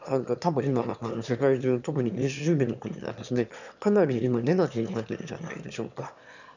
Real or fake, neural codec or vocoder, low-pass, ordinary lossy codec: fake; autoencoder, 22.05 kHz, a latent of 192 numbers a frame, VITS, trained on one speaker; 7.2 kHz; none